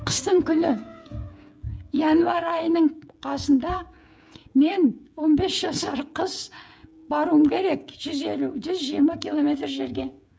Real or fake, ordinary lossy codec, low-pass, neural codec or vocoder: fake; none; none; codec, 16 kHz, 16 kbps, FreqCodec, smaller model